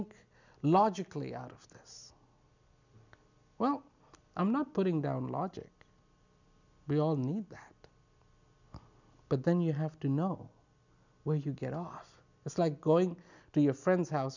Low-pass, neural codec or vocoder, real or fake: 7.2 kHz; none; real